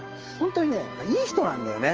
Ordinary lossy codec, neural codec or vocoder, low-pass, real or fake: Opus, 24 kbps; codec, 16 kHz, 6 kbps, DAC; 7.2 kHz; fake